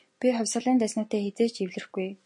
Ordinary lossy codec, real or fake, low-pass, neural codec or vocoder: MP3, 48 kbps; real; 10.8 kHz; none